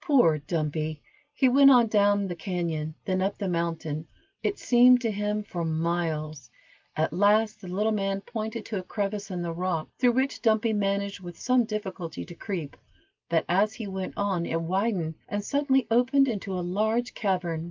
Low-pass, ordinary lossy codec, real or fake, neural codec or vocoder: 7.2 kHz; Opus, 24 kbps; real; none